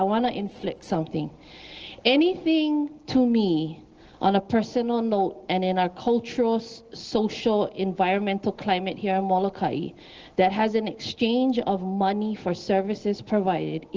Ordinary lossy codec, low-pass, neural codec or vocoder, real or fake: Opus, 16 kbps; 7.2 kHz; none; real